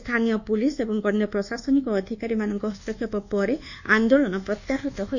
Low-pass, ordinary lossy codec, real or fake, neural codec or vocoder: 7.2 kHz; none; fake; codec, 24 kHz, 1.2 kbps, DualCodec